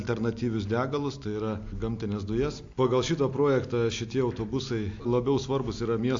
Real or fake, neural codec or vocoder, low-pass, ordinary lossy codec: real; none; 7.2 kHz; AAC, 48 kbps